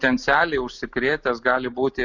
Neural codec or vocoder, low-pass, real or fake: none; 7.2 kHz; real